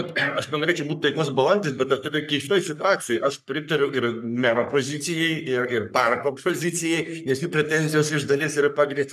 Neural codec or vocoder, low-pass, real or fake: codec, 44.1 kHz, 3.4 kbps, Pupu-Codec; 14.4 kHz; fake